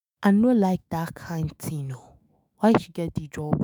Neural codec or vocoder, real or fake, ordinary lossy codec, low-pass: autoencoder, 48 kHz, 128 numbers a frame, DAC-VAE, trained on Japanese speech; fake; none; none